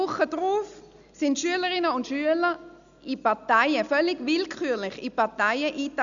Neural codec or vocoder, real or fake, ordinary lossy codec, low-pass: none; real; none; 7.2 kHz